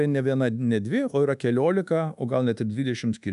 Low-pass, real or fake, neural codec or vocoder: 10.8 kHz; fake; codec, 24 kHz, 1.2 kbps, DualCodec